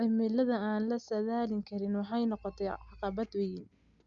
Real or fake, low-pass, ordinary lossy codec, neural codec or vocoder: real; 7.2 kHz; Opus, 64 kbps; none